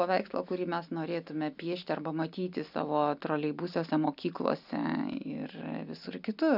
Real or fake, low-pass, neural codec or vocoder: real; 5.4 kHz; none